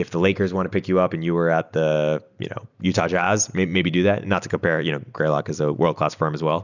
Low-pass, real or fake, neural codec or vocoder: 7.2 kHz; real; none